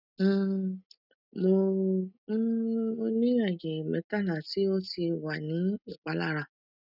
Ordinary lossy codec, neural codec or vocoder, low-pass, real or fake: none; none; 5.4 kHz; real